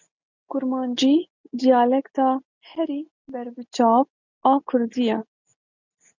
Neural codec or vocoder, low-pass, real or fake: none; 7.2 kHz; real